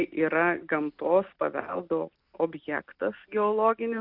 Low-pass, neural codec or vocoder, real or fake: 5.4 kHz; none; real